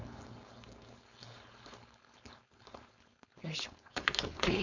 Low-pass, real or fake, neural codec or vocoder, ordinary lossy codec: 7.2 kHz; fake; codec, 16 kHz, 4.8 kbps, FACodec; none